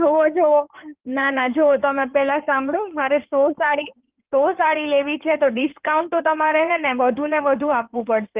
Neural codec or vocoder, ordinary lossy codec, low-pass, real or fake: codec, 16 kHz in and 24 kHz out, 2.2 kbps, FireRedTTS-2 codec; Opus, 32 kbps; 3.6 kHz; fake